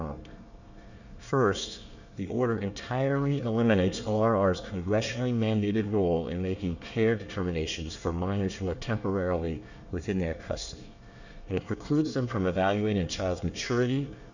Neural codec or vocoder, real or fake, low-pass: codec, 24 kHz, 1 kbps, SNAC; fake; 7.2 kHz